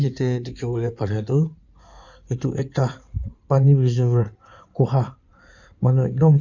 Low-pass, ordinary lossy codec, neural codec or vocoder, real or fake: 7.2 kHz; none; codec, 16 kHz in and 24 kHz out, 2.2 kbps, FireRedTTS-2 codec; fake